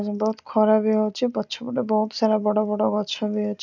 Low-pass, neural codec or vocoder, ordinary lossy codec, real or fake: 7.2 kHz; none; none; real